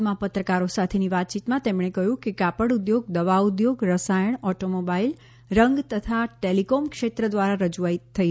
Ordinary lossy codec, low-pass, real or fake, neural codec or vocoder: none; none; real; none